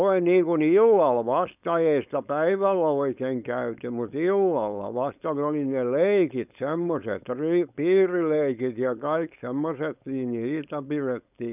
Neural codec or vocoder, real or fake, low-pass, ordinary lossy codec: codec, 16 kHz, 4.8 kbps, FACodec; fake; 3.6 kHz; none